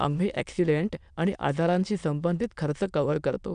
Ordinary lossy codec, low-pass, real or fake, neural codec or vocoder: none; 9.9 kHz; fake; autoencoder, 22.05 kHz, a latent of 192 numbers a frame, VITS, trained on many speakers